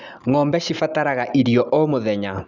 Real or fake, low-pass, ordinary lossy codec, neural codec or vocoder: real; 7.2 kHz; none; none